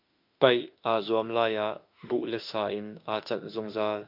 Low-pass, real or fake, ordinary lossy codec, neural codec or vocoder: 5.4 kHz; fake; MP3, 48 kbps; autoencoder, 48 kHz, 32 numbers a frame, DAC-VAE, trained on Japanese speech